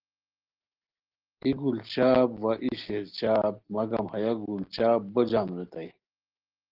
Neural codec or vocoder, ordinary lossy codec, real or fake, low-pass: none; Opus, 16 kbps; real; 5.4 kHz